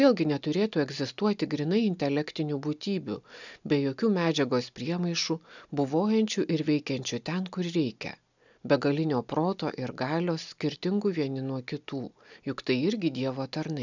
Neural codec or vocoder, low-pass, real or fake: none; 7.2 kHz; real